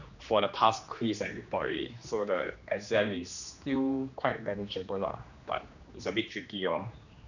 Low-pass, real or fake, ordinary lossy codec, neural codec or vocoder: 7.2 kHz; fake; none; codec, 16 kHz, 2 kbps, X-Codec, HuBERT features, trained on general audio